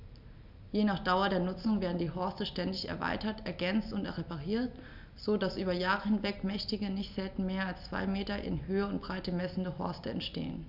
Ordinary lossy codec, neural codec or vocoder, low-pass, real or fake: none; none; 5.4 kHz; real